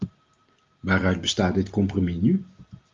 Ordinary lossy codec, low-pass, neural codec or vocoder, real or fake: Opus, 32 kbps; 7.2 kHz; none; real